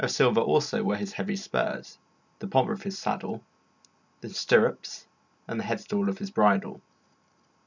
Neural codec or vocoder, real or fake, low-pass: none; real; 7.2 kHz